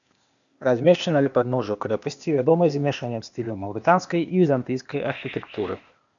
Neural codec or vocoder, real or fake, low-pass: codec, 16 kHz, 0.8 kbps, ZipCodec; fake; 7.2 kHz